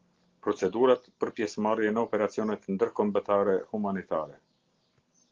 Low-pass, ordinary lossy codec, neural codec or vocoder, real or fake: 7.2 kHz; Opus, 16 kbps; none; real